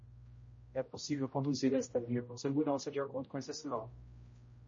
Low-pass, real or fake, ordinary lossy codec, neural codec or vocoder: 7.2 kHz; fake; MP3, 32 kbps; codec, 16 kHz, 0.5 kbps, X-Codec, HuBERT features, trained on general audio